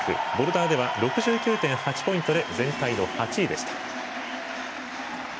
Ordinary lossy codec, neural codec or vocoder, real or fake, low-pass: none; none; real; none